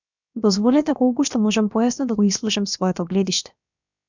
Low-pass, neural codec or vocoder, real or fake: 7.2 kHz; codec, 16 kHz, about 1 kbps, DyCAST, with the encoder's durations; fake